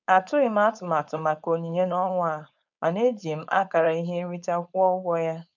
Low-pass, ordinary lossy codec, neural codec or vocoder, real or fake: 7.2 kHz; none; codec, 16 kHz, 4.8 kbps, FACodec; fake